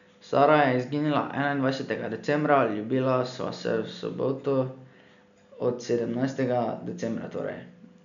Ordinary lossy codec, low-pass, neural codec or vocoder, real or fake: none; 7.2 kHz; none; real